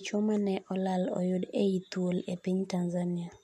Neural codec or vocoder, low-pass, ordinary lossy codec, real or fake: none; 14.4 kHz; MP3, 64 kbps; real